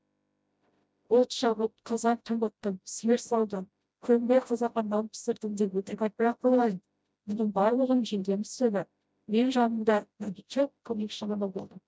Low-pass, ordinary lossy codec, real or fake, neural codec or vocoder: none; none; fake; codec, 16 kHz, 0.5 kbps, FreqCodec, smaller model